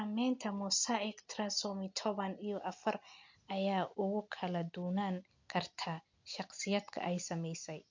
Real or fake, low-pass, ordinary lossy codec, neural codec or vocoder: real; 7.2 kHz; MP3, 48 kbps; none